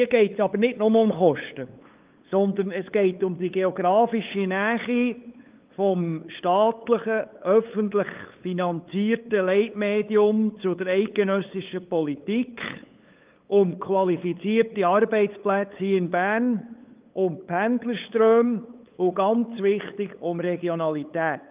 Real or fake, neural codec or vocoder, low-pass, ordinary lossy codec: fake; codec, 16 kHz, 8 kbps, FunCodec, trained on LibriTTS, 25 frames a second; 3.6 kHz; Opus, 32 kbps